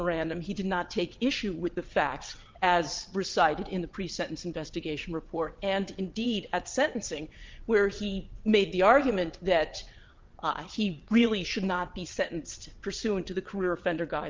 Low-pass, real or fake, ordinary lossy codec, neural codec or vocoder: 7.2 kHz; fake; Opus, 24 kbps; vocoder, 22.05 kHz, 80 mel bands, WaveNeXt